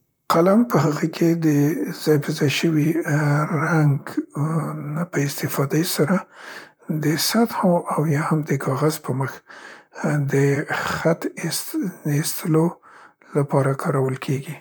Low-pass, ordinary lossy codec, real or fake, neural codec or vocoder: none; none; fake; vocoder, 44.1 kHz, 128 mel bands, Pupu-Vocoder